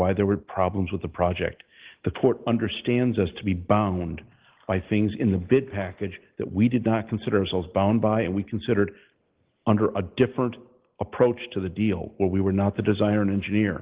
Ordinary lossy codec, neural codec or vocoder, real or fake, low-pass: Opus, 16 kbps; none; real; 3.6 kHz